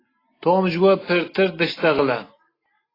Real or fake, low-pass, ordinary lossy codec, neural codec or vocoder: real; 5.4 kHz; AAC, 24 kbps; none